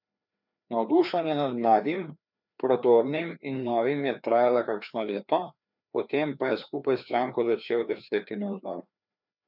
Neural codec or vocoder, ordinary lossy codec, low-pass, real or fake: codec, 16 kHz, 2 kbps, FreqCodec, larger model; none; 5.4 kHz; fake